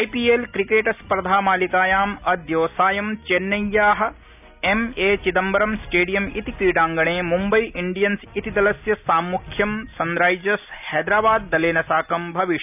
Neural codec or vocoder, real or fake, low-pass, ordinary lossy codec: none; real; 3.6 kHz; none